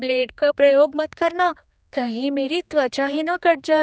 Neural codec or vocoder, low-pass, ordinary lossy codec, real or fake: codec, 16 kHz, 2 kbps, X-Codec, HuBERT features, trained on general audio; none; none; fake